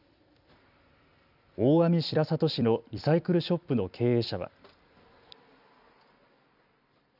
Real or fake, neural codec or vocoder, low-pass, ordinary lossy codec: fake; vocoder, 44.1 kHz, 128 mel bands every 256 samples, BigVGAN v2; 5.4 kHz; none